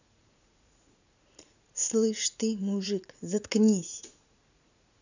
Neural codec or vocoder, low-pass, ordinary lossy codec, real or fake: vocoder, 44.1 kHz, 80 mel bands, Vocos; 7.2 kHz; none; fake